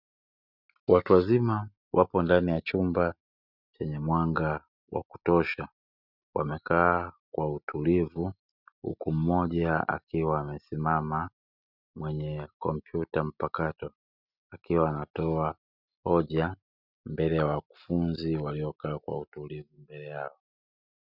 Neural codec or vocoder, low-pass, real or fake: none; 5.4 kHz; real